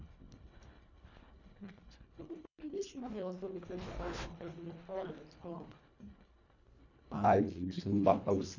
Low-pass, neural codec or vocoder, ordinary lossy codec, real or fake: 7.2 kHz; codec, 24 kHz, 1.5 kbps, HILCodec; none; fake